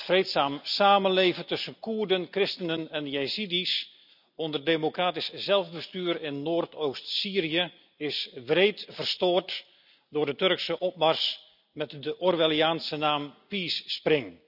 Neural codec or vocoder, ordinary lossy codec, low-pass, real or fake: none; none; 5.4 kHz; real